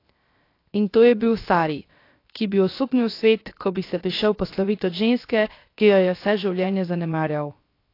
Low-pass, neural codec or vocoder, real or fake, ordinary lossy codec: 5.4 kHz; codec, 16 kHz, 0.7 kbps, FocalCodec; fake; AAC, 32 kbps